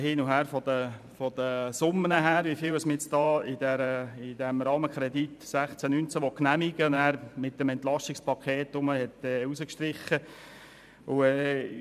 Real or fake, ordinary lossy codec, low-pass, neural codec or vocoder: fake; MP3, 96 kbps; 14.4 kHz; vocoder, 44.1 kHz, 128 mel bands every 512 samples, BigVGAN v2